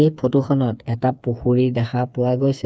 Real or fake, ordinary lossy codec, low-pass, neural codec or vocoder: fake; none; none; codec, 16 kHz, 4 kbps, FreqCodec, smaller model